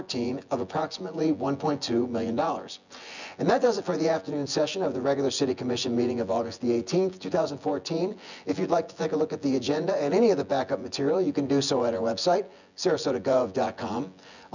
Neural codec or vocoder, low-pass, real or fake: vocoder, 24 kHz, 100 mel bands, Vocos; 7.2 kHz; fake